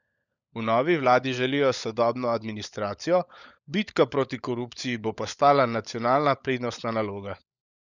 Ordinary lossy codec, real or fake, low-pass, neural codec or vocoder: none; fake; 7.2 kHz; codec, 16 kHz, 16 kbps, FunCodec, trained on LibriTTS, 50 frames a second